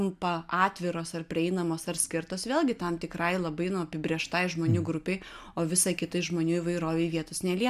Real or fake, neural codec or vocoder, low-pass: real; none; 14.4 kHz